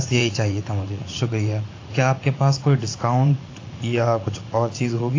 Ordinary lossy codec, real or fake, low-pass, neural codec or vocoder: AAC, 32 kbps; fake; 7.2 kHz; vocoder, 44.1 kHz, 80 mel bands, Vocos